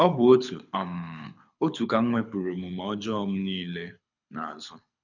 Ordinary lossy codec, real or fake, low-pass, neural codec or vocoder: none; fake; 7.2 kHz; codec, 24 kHz, 6 kbps, HILCodec